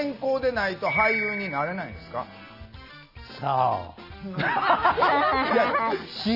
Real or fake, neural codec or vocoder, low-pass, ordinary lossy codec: real; none; 5.4 kHz; none